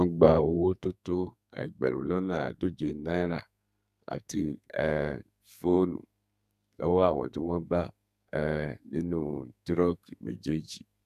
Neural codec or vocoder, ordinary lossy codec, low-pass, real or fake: codec, 32 kHz, 1.9 kbps, SNAC; none; 14.4 kHz; fake